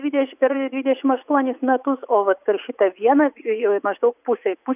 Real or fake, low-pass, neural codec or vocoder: fake; 3.6 kHz; vocoder, 44.1 kHz, 80 mel bands, Vocos